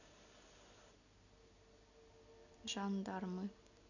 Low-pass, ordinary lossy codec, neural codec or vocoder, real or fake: 7.2 kHz; none; none; real